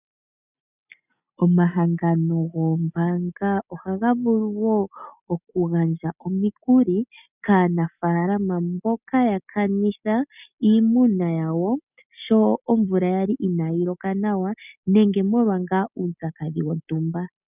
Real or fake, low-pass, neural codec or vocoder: real; 3.6 kHz; none